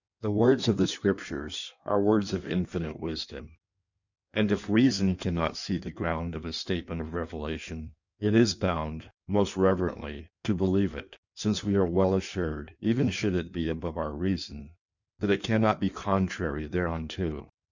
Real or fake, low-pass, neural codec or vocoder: fake; 7.2 kHz; codec, 16 kHz in and 24 kHz out, 1.1 kbps, FireRedTTS-2 codec